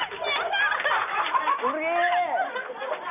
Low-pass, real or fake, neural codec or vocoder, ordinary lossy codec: 3.6 kHz; real; none; none